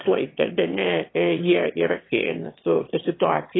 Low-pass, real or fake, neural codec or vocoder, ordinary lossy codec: 7.2 kHz; fake; autoencoder, 22.05 kHz, a latent of 192 numbers a frame, VITS, trained on one speaker; AAC, 16 kbps